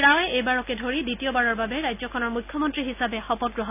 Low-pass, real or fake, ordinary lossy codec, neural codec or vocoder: 3.6 kHz; real; none; none